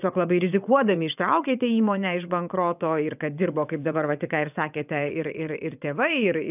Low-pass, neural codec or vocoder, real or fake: 3.6 kHz; vocoder, 22.05 kHz, 80 mel bands, WaveNeXt; fake